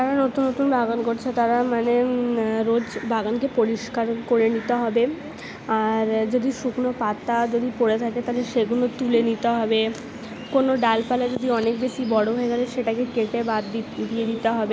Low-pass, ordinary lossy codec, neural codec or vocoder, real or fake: none; none; none; real